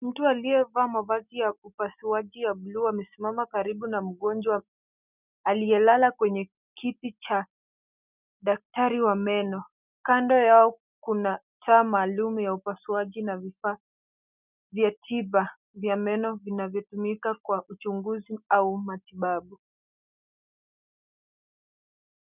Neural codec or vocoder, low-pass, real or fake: none; 3.6 kHz; real